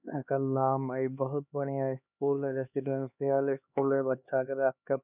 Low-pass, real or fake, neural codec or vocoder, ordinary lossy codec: 3.6 kHz; fake; codec, 16 kHz, 2 kbps, X-Codec, HuBERT features, trained on LibriSpeech; none